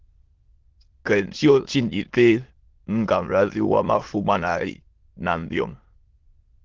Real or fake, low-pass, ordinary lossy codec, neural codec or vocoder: fake; 7.2 kHz; Opus, 16 kbps; autoencoder, 22.05 kHz, a latent of 192 numbers a frame, VITS, trained on many speakers